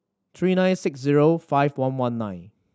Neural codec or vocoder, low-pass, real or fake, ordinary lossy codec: none; none; real; none